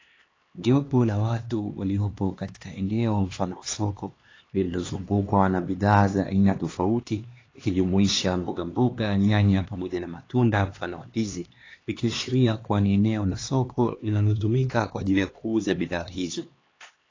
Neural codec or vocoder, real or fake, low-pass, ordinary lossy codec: codec, 16 kHz, 2 kbps, X-Codec, HuBERT features, trained on LibriSpeech; fake; 7.2 kHz; AAC, 32 kbps